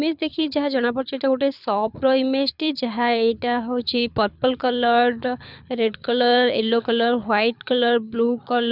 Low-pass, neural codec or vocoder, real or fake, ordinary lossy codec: 5.4 kHz; codec, 24 kHz, 6 kbps, HILCodec; fake; none